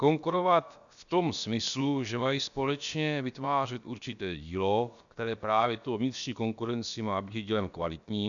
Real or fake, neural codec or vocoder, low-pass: fake; codec, 16 kHz, about 1 kbps, DyCAST, with the encoder's durations; 7.2 kHz